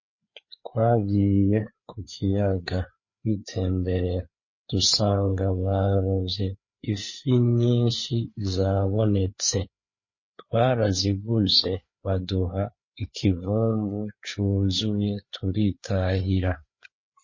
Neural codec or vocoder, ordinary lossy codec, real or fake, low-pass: codec, 16 kHz, 4 kbps, FreqCodec, larger model; MP3, 32 kbps; fake; 7.2 kHz